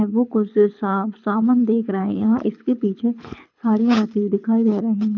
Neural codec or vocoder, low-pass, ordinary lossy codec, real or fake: codec, 24 kHz, 6 kbps, HILCodec; 7.2 kHz; none; fake